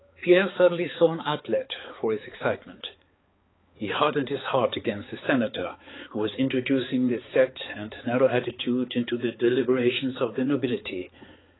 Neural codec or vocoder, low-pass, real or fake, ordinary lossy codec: codec, 16 kHz, 4 kbps, X-Codec, HuBERT features, trained on balanced general audio; 7.2 kHz; fake; AAC, 16 kbps